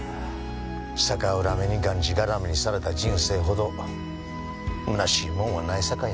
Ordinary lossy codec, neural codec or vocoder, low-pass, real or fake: none; none; none; real